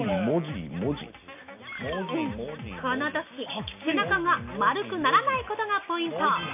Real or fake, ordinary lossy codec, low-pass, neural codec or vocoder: real; AAC, 32 kbps; 3.6 kHz; none